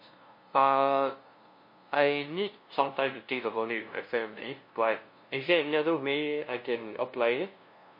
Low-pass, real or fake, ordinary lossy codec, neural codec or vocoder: 5.4 kHz; fake; MP3, 32 kbps; codec, 16 kHz, 0.5 kbps, FunCodec, trained on LibriTTS, 25 frames a second